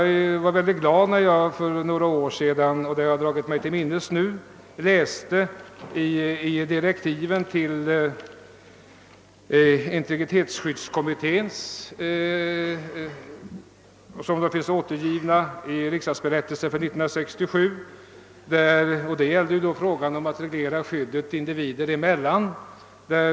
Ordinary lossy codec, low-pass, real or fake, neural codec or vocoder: none; none; real; none